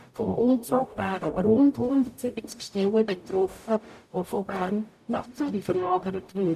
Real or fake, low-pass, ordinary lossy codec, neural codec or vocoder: fake; 14.4 kHz; none; codec, 44.1 kHz, 0.9 kbps, DAC